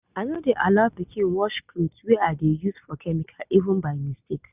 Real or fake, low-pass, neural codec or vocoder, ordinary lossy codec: real; 3.6 kHz; none; none